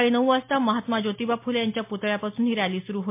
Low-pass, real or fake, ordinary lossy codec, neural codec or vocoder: 3.6 kHz; real; MP3, 24 kbps; none